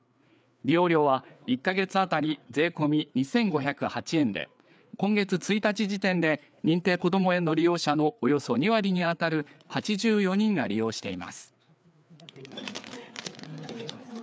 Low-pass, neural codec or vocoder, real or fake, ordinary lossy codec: none; codec, 16 kHz, 4 kbps, FreqCodec, larger model; fake; none